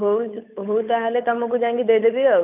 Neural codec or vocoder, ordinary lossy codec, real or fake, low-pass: codec, 16 kHz, 8 kbps, FunCodec, trained on Chinese and English, 25 frames a second; none; fake; 3.6 kHz